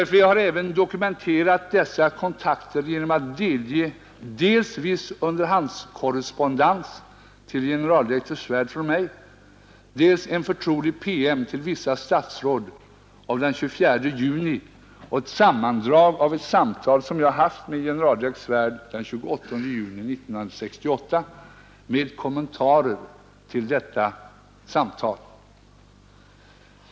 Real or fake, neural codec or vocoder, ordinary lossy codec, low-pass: real; none; none; none